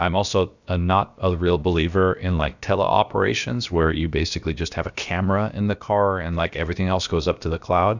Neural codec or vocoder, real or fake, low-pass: codec, 16 kHz, about 1 kbps, DyCAST, with the encoder's durations; fake; 7.2 kHz